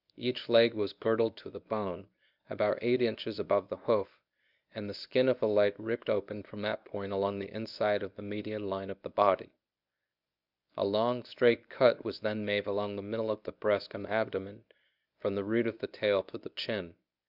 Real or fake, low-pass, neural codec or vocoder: fake; 5.4 kHz; codec, 24 kHz, 0.9 kbps, WavTokenizer, medium speech release version 1